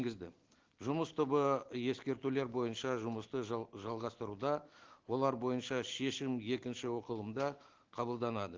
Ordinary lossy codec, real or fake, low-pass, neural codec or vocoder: Opus, 16 kbps; real; 7.2 kHz; none